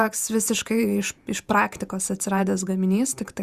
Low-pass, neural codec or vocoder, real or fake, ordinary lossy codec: 14.4 kHz; vocoder, 48 kHz, 128 mel bands, Vocos; fake; Opus, 64 kbps